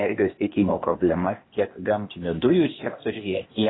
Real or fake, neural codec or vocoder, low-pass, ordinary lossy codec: fake; codec, 16 kHz, 0.8 kbps, ZipCodec; 7.2 kHz; AAC, 16 kbps